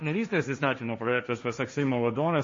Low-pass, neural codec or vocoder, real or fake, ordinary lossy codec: 7.2 kHz; codec, 16 kHz, 1.1 kbps, Voila-Tokenizer; fake; MP3, 32 kbps